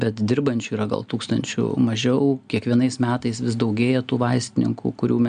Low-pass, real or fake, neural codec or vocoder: 9.9 kHz; real; none